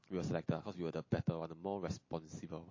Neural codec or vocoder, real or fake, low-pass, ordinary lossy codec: none; real; 7.2 kHz; MP3, 32 kbps